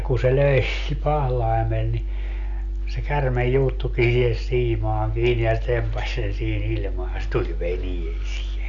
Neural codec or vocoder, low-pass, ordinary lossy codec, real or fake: none; 7.2 kHz; none; real